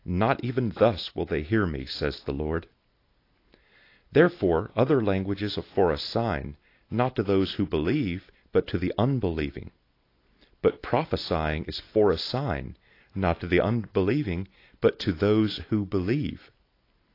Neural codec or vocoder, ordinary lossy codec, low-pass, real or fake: none; AAC, 32 kbps; 5.4 kHz; real